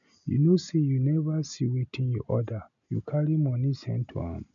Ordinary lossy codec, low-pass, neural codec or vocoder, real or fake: none; 7.2 kHz; none; real